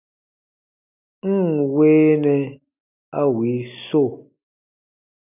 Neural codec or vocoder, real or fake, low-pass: none; real; 3.6 kHz